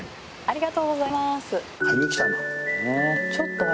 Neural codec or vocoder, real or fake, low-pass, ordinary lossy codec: none; real; none; none